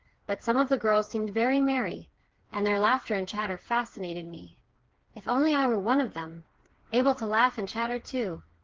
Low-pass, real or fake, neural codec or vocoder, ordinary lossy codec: 7.2 kHz; fake; codec, 16 kHz, 4 kbps, FreqCodec, smaller model; Opus, 16 kbps